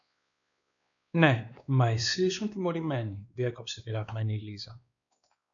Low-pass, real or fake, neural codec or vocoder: 7.2 kHz; fake; codec, 16 kHz, 2 kbps, X-Codec, WavLM features, trained on Multilingual LibriSpeech